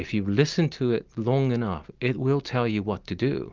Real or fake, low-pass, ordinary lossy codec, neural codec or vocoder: real; 7.2 kHz; Opus, 24 kbps; none